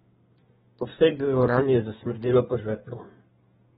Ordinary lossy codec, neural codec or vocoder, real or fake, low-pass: AAC, 16 kbps; codec, 32 kHz, 1.9 kbps, SNAC; fake; 14.4 kHz